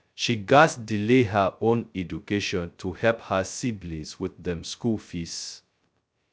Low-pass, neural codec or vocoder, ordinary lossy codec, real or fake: none; codec, 16 kHz, 0.2 kbps, FocalCodec; none; fake